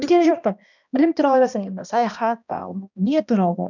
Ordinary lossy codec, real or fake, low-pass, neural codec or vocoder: none; fake; 7.2 kHz; codec, 16 kHz, 1 kbps, X-Codec, HuBERT features, trained on balanced general audio